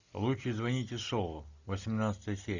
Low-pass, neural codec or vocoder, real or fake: 7.2 kHz; none; real